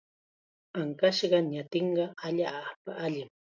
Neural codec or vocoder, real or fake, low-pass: none; real; 7.2 kHz